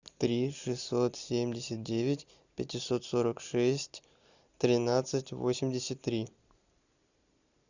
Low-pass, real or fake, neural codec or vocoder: 7.2 kHz; real; none